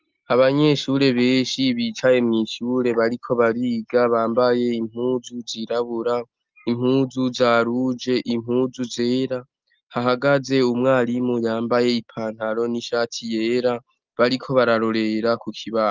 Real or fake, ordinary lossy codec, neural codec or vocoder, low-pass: real; Opus, 32 kbps; none; 7.2 kHz